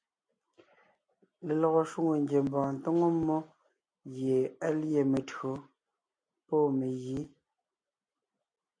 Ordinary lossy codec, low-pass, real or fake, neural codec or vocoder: MP3, 48 kbps; 9.9 kHz; real; none